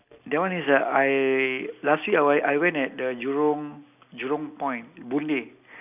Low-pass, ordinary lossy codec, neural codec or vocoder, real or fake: 3.6 kHz; none; none; real